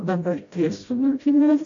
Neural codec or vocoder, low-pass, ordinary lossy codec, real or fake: codec, 16 kHz, 0.5 kbps, FreqCodec, smaller model; 7.2 kHz; AAC, 48 kbps; fake